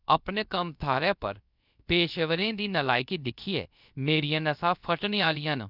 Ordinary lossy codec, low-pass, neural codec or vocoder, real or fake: none; 5.4 kHz; codec, 16 kHz, about 1 kbps, DyCAST, with the encoder's durations; fake